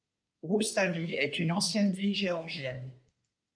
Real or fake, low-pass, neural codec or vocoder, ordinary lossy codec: fake; 9.9 kHz; codec, 24 kHz, 1 kbps, SNAC; MP3, 96 kbps